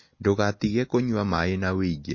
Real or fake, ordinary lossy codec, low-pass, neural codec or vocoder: real; MP3, 32 kbps; 7.2 kHz; none